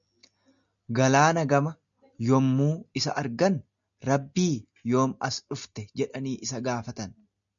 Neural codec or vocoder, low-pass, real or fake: none; 7.2 kHz; real